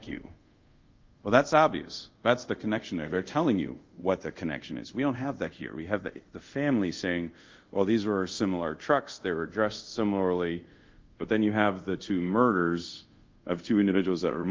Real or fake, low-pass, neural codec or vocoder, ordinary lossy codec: fake; 7.2 kHz; codec, 24 kHz, 0.5 kbps, DualCodec; Opus, 32 kbps